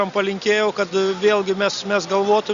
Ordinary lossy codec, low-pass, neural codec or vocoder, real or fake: Opus, 64 kbps; 7.2 kHz; none; real